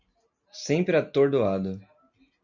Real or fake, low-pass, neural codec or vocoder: real; 7.2 kHz; none